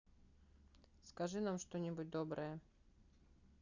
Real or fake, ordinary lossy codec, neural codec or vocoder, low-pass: real; none; none; 7.2 kHz